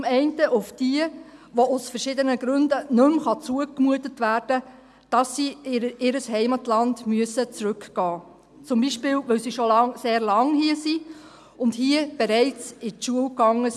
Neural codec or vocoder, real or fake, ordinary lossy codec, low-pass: none; real; none; none